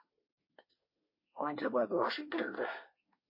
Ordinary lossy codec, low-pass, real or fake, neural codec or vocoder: MP3, 24 kbps; 5.4 kHz; fake; codec, 24 kHz, 1 kbps, SNAC